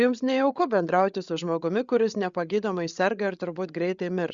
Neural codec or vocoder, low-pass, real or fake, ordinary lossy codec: codec, 16 kHz, 8 kbps, FreqCodec, larger model; 7.2 kHz; fake; Opus, 64 kbps